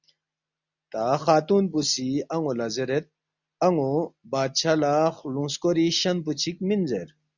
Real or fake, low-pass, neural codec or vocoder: real; 7.2 kHz; none